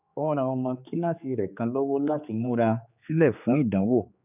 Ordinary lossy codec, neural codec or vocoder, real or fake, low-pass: none; codec, 16 kHz, 4 kbps, X-Codec, HuBERT features, trained on general audio; fake; 3.6 kHz